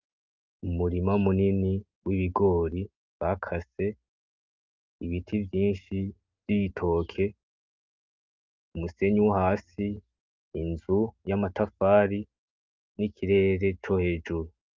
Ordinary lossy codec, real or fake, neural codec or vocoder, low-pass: Opus, 24 kbps; real; none; 7.2 kHz